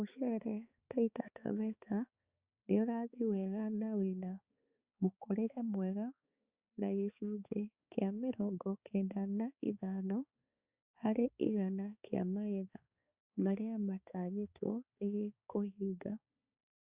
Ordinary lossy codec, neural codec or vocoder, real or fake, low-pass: Opus, 64 kbps; codec, 16 kHz, 4 kbps, X-Codec, HuBERT features, trained on balanced general audio; fake; 3.6 kHz